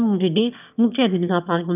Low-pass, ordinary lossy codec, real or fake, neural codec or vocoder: 3.6 kHz; none; fake; autoencoder, 22.05 kHz, a latent of 192 numbers a frame, VITS, trained on one speaker